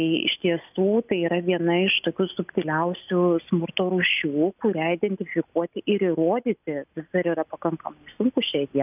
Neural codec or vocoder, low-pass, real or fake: none; 3.6 kHz; real